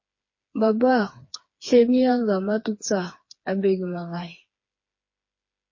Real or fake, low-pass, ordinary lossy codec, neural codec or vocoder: fake; 7.2 kHz; MP3, 32 kbps; codec, 16 kHz, 4 kbps, FreqCodec, smaller model